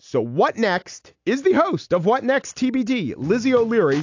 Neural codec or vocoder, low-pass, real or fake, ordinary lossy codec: none; 7.2 kHz; real; AAC, 48 kbps